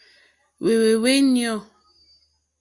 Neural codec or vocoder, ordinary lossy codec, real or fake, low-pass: none; Opus, 64 kbps; real; 10.8 kHz